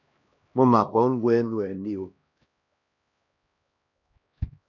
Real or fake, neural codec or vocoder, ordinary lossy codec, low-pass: fake; codec, 16 kHz, 1 kbps, X-Codec, HuBERT features, trained on LibriSpeech; none; 7.2 kHz